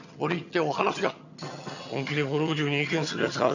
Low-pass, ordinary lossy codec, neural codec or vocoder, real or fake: 7.2 kHz; none; vocoder, 22.05 kHz, 80 mel bands, HiFi-GAN; fake